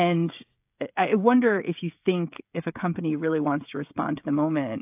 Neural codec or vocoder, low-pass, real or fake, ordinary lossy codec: codec, 16 kHz, 16 kbps, FreqCodec, smaller model; 3.6 kHz; fake; AAC, 32 kbps